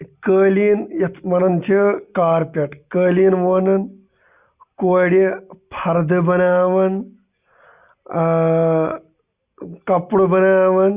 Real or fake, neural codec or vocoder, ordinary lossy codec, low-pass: real; none; Opus, 64 kbps; 3.6 kHz